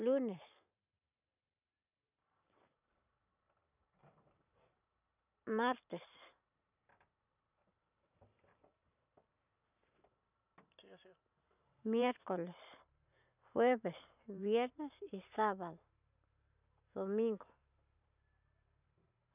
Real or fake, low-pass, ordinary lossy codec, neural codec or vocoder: fake; 3.6 kHz; none; vocoder, 44.1 kHz, 128 mel bands every 512 samples, BigVGAN v2